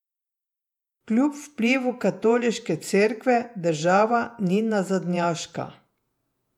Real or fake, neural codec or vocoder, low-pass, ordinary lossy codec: fake; vocoder, 48 kHz, 128 mel bands, Vocos; 19.8 kHz; none